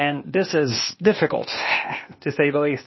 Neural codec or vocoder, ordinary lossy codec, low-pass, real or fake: codec, 16 kHz, 0.8 kbps, ZipCodec; MP3, 24 kbps; 7.2 kHz; fake